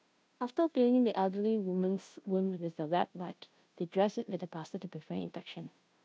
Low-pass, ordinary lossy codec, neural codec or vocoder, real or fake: none; none; codec, 16 kHz, 0.5 kbps, FunCodec, trained on Chinese and English, 25 frames a second; fake